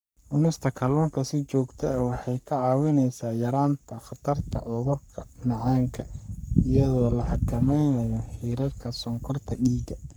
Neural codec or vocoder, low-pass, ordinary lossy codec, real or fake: codec, 44.1 kHz, 3.4 kbps, Pupu-Codec; none; none; fake